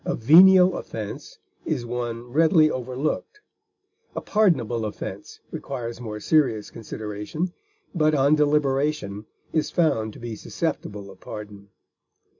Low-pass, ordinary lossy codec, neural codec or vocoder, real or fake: 7.2 kHz; MP3, 64 kbps; none; real